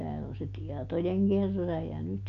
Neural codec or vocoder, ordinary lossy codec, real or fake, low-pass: none; none; real; 7.2 kHz